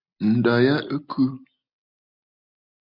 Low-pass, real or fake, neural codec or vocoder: 5.4 kHz; real; none